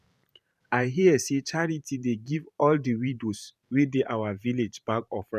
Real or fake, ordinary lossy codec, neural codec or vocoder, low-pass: fake; none; vocoder, 44.1 kHz, 128 mel bands every 512 samples, BigVGAN v2; 14.4 kHz